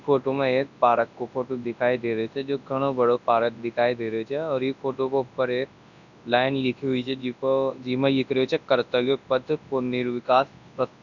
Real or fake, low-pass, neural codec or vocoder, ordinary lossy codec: fake; 7.2 kHz; codec, 24 kHz, 0.9 kbps, WavTokenizer, large speech release; none